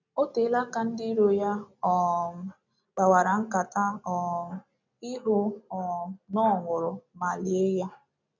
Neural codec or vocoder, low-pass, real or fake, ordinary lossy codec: none; 7.2 kHz; real; none